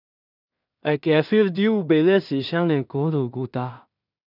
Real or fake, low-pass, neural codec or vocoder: fake; 5.4 kHz; codec, 16 kHz in and 24 kHz out, 0.4 kbps, LongCat-Audio-Codec, two codebook decoder